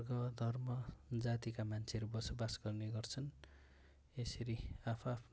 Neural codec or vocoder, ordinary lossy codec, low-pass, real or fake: none; none; none; real